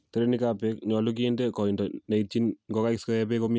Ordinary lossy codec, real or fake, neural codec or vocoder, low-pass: none; real; none; none